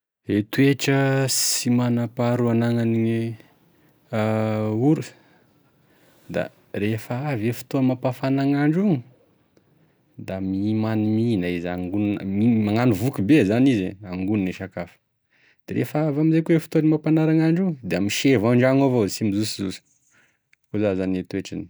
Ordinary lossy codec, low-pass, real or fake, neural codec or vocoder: none; none; real; none